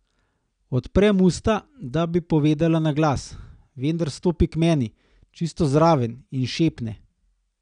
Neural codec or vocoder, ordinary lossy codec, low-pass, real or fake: none; none; 9.9 kHz; real